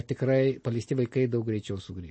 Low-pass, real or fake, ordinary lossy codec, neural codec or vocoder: 9.9 kHz; real; MP3, 32 kbps; none